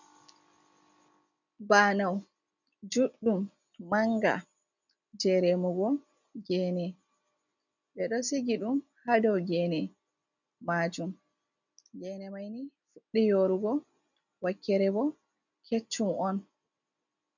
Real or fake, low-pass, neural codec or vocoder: real; 7.2 kHz; none